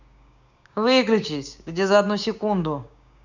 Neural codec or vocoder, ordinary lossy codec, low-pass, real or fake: codec, 16 kHz, 6 kbps, DAC; none; 7.2 kHz; fake